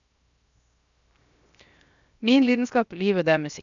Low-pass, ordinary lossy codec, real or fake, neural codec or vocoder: 7.2 kHz; none; fake; codec, 16 kHz, 0.7 kbps, FocalCodec